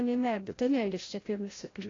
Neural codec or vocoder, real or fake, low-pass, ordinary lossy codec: codec, 16 kHz, 0.5 kbps, FreqCodec, larger model; fake; 7.2 kHz; AAC, 32 kbps